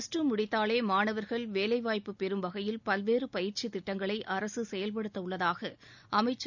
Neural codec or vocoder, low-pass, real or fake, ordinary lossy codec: none; 7.2 kHz; real; none